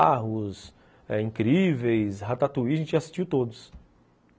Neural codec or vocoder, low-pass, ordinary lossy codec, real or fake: none; none; none; real